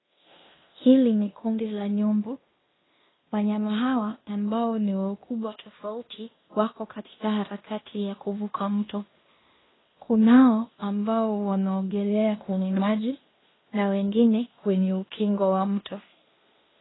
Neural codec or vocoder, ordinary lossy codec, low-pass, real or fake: codec, 16 kHz in and 24 kHz out, 0.9 kbps, LongCat-Audio-Codec, fine tuned four codebook decoder; AAC, 16 kbps; 7.2 kHz; fake